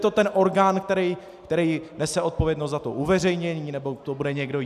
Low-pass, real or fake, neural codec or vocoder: 14.4 kHz; real; none